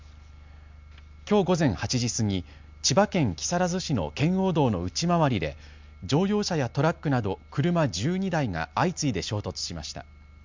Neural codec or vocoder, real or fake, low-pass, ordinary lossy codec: none; real; 7.2 kHz; MP3, 64 kbps